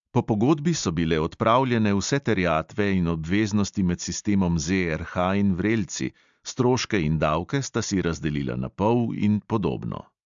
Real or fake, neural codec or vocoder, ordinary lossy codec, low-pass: real; none; MP3, 64 kbps; 7.2 kHz